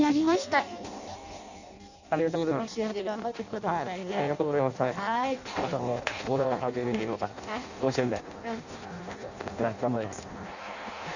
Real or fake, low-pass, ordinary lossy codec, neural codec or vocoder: fake; 7.2 kHz; none; codec, 16 kHz in and 24 kHz out, 0.6 kbps, FireRedTTS-2 codec